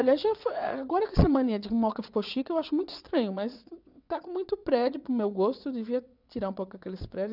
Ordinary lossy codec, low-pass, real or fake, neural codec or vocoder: none; 5.4 kHz; fake; vocoder, 22.05 kHz, 80 mel bands, WaveNeXt